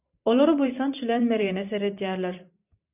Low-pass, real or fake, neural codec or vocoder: 3.6 kHz; fake; vocoder, 44.1 kHz, 128 mel bands every 512 samples, BigVGAN v2